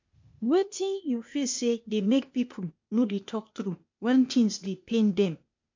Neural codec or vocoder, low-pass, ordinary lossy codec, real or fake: codec, 16 kHz, 0.8 kbps, ZipCodec; 7.2 kHz; MP3, 48 kbps; fake